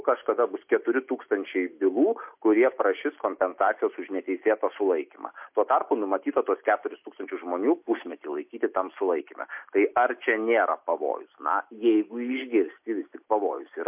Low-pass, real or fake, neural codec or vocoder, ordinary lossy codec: 3.6 kHz; real; none; MP3, 32 kbps